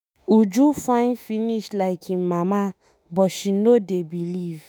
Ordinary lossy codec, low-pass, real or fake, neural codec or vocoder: none; none; fake; autoencoder, 48 kHz, 32 numbers a frame, DAC-VAE, trained on Japanese speech